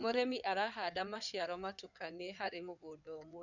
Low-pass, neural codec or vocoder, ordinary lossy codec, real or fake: 7.2 kHz; codec, 16 kHz in and 24 kHz out, 2.2 kbps, FireRedTTS-2 codec; none; fake